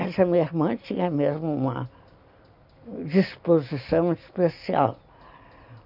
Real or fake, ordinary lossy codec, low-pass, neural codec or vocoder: real; none; 5.4 kHz; none